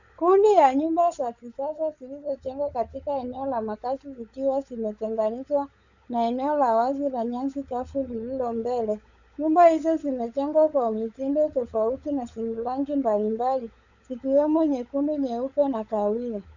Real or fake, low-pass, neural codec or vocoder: fake; 7.2 kHz; codec, 16 kHz, 16 kbps, FunCodec, trained on LibriTTS, 50 frames a second